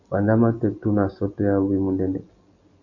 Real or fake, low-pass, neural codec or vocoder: real; 7.2 kHz; none